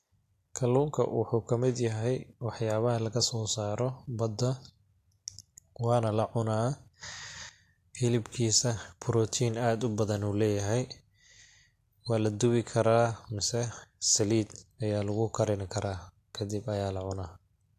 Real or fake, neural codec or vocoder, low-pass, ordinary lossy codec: real; none; 14.4 kHz; AAC, 64 kbps